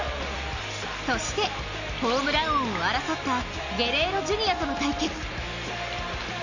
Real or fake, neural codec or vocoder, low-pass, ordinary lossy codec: real; none; 7.2 kHz; none